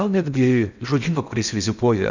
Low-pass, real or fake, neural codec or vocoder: 7.2 kHz; fake; codec, 16 kHz in and 24 kHz out, 0.6 kbps, FocalCodec, streaming, 4096 codes